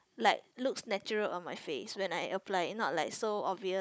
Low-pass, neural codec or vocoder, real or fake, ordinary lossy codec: none; codec, 16 kHz, 16 kbps, FunCodec, trained on Chinese and English, 50 frames a second; fake; none